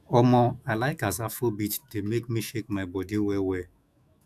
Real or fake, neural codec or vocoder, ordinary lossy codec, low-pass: fake; autoencoder, 48 kHz, 128 numbers a frame, DAC-VAE, trained on Japanese speech; none; 14.4 kHz